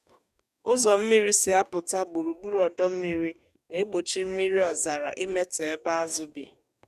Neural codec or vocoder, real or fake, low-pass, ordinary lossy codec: codec, 44.1 kHz, 2.6 kbps, DAC; fake; 14.4 kHz; none